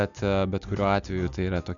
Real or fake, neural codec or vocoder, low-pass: real; none; 7.2 kHz